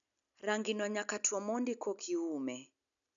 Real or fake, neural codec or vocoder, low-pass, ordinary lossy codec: real; none; 7.2 kHz; none